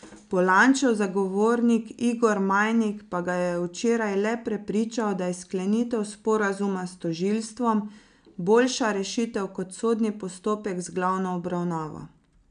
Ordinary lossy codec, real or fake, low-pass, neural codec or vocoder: none; real; 9.9 kHz; none